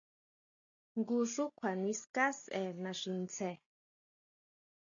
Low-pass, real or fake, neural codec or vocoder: 7.2 kHz; real; none